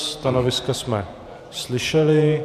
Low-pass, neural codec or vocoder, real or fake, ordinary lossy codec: 14.4 kHz; vocoder, 48 kHz, 128 mel bands, Vocos; fake; MP3, 96 kbps